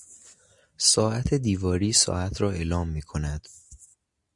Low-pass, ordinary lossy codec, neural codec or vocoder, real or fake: 10.8 kHz; Opus, 64 kbps; none; real